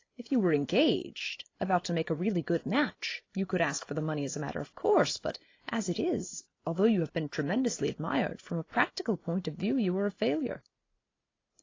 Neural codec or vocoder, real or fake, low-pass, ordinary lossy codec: none; real; 7.2 kHz; AAC, 32 kbps